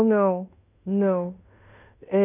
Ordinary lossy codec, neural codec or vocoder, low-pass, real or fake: none; codec, 16 kHz in and 24 kHz out, 0.9 kbps, LongCat-Audio-Codec, fine tuned four codebook decoder; 3.6 kHz; fake